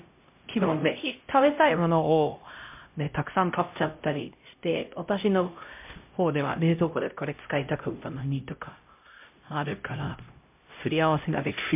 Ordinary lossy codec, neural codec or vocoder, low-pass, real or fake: MP3, 32 kbps; codec, 16 kHz, 0.5 kbps, X-Codec, HuBERT features, trained on LibriSpeech; 3.6 kHz; fake